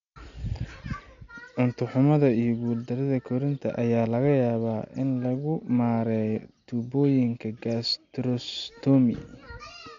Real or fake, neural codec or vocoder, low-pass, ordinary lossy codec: real; none; 7.2 kHz; MP3, 64 kbps